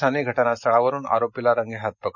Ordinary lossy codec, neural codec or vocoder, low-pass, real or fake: none; none; 7.2 kHz; real